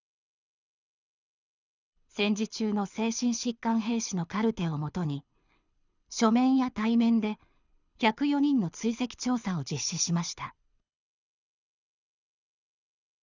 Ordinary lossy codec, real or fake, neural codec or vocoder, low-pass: none; fake; codec, 24 kHz, 6 kbps, HILCodec; 7.2 kHz